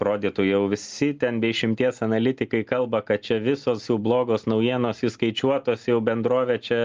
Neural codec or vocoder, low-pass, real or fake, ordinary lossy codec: none; 7.2 kHz; real; Opus, 32 kbps